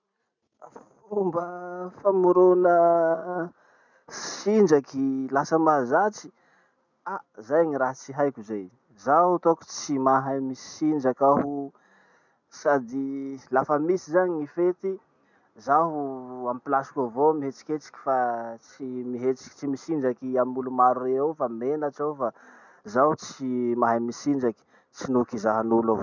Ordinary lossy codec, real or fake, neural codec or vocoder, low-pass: none; real; none; 7.2 kHz